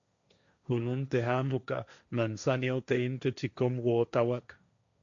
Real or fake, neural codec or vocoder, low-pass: fake; codec, 16 kHz, 1.1 kbps, Voila-Tokenizer; 7.2 kHz